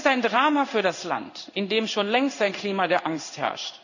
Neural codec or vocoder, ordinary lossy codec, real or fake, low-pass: codec, 16 kHz in and 24 kHz out, 1 kbps, XY-Tokenizer; none; fake; 7.2 kHz